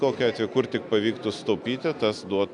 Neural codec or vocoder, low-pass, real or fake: none; 10.8 kHz; real